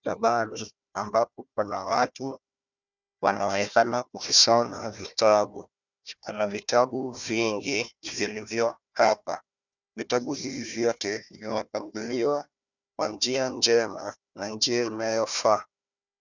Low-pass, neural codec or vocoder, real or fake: 7.2 kHz; codec, 16 kHz, 1 kbps, FunCodec, trained on Chinese and English, 50 frames a second; fake